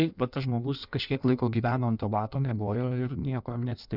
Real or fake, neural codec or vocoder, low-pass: fake; codec, 16 kHz in and 24 kHz out, 1.1 kbps, FireRedTTS-2 codec; 5.4 kHz